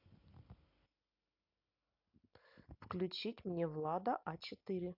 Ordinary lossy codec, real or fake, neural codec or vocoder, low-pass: AAC, 48 kbps; real; none; 5.4 kHz